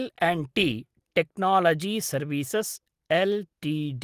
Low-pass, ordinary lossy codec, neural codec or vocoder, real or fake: 14.4 kHz; Opus, 24 kbps; none; real